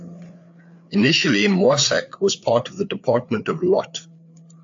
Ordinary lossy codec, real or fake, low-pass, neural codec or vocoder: AAC, 64 kbps; fake; 7.2 kHz; codec, 16 kHz, 4 kbps, FreqCodec, larger model